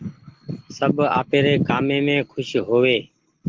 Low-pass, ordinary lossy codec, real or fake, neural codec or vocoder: 7.2 kHz; Opus, 16 kbps; real; none